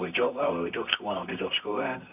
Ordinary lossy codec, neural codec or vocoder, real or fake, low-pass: none; codec, 24 kHz, 0.9 kbps, WavTokenizer, medium music audio release; fake; 3.6 kHz